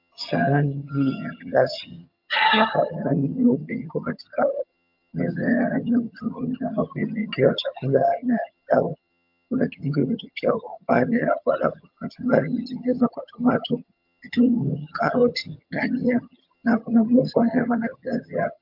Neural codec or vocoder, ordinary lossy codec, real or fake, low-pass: vocoder, 22.05 kHz, 80 mel bands, HiFi-GAN; MP3, 48 kbps; fake; 5.4 kHz